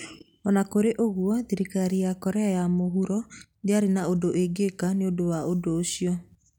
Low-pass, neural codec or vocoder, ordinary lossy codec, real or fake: 19.8 kHz; none; none; real